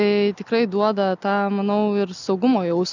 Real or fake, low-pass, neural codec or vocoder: real; 7.2 kHz; none